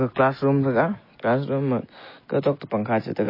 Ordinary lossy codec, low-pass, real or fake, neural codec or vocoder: MP3, 24 kbps; 5.4 kHz; real; none